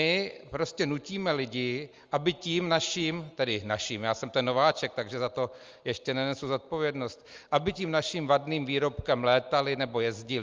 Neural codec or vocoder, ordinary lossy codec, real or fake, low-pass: none; Opus, 32 kbps; real; 7.2 kHz